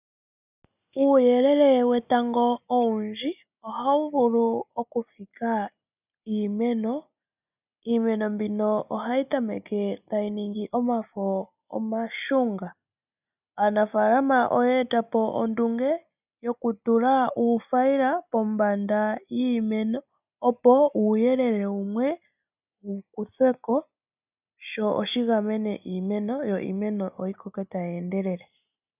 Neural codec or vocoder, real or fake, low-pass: none; real; 3.6 kHz